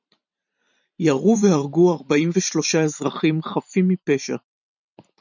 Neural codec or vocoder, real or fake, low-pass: vocoder, 44.1 kHz, 128 mel bands every 512 samples, BigVGAN v2; fake; 7.2 kHz